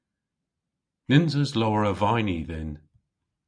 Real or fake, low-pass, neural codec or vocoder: real; 9.9 kHz; none